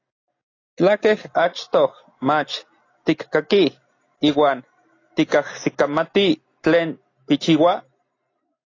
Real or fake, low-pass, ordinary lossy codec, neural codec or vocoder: real; 7.2 kHz; AAC, 32 kbps; none